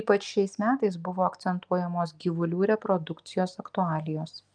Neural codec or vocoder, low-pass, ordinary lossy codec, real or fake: none; 9.9 kHz; Opus, 32 kbps; real